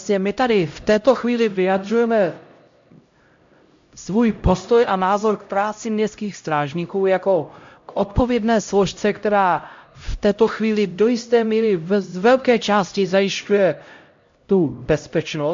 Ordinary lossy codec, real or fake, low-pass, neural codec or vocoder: MP3, 48 kbps; fake; 7.2 kHz; codec, 16 kHz, 0.5 kbps, X-Codec, HuBERT features, trained on LibriSpeech